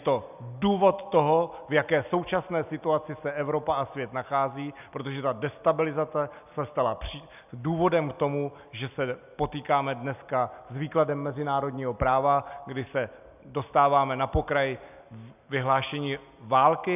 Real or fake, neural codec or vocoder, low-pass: real; none; 3.6 kHz